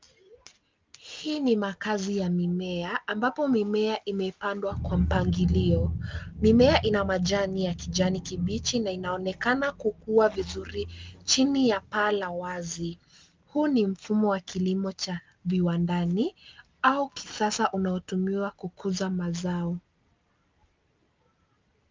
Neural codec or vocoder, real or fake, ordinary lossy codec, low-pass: none; real; Opus, 24 kbps; 7.2 kHz